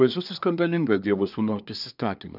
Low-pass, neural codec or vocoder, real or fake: 5.4 kHz; codec, 24 kHz, 1 kbps, SNAC; fake